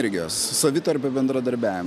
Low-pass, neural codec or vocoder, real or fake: 14.4 kHz; none; real